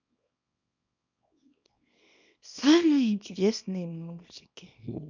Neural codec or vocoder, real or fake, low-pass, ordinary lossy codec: codec, 24 kHz, 0.9 kbps, WavTokenizer, small release; fake; 7.2 kHz; none